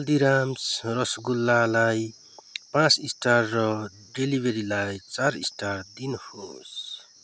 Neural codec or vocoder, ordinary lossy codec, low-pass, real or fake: none; none; none; real